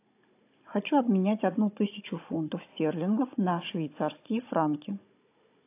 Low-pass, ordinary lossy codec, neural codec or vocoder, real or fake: 3.6 kHz; AAC, 24 kbps; codec, 16 kHz, 16 kbps, FunCodec, trained on Chinese and English, 50 frames a second; fake